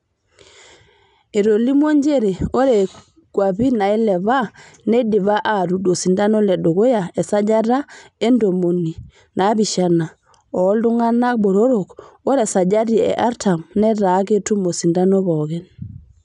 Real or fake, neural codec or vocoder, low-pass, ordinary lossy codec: real; none; 10.8 kHz; none